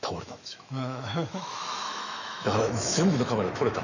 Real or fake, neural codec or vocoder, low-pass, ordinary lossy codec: fake; autoencoder, 48 kHz, 128 numbers a frame, DAC-VAE, trained on Japanese speech; 7.2 kHz; none